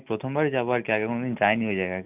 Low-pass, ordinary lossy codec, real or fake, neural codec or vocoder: 3.6 kHz; none; real; none